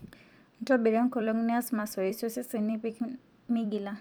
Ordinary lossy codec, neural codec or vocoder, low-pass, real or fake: none; vocoder, 44.1 kHz, 128 mel bands every 256 samples, BigVGAN v2; none; fake